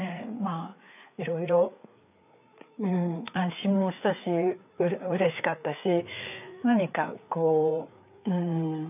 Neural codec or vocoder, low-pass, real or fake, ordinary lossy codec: codec, 16 kHz, 4 kbps, FreqCodec, larger model; 3.6 kHz; fake; none